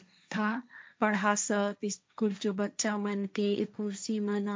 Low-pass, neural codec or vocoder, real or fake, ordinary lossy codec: none; codec, 16 kHz, 1.1 kbps, Voila-Tokenizer; fake; none